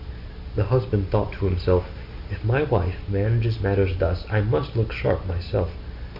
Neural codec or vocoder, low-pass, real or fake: none; 5.4 kHz; real